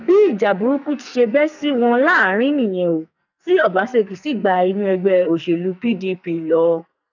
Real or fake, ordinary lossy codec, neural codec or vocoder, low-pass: fake; none; codec, 44.1 kHz, 2.6 kbps, SNAC; 7.2 kHz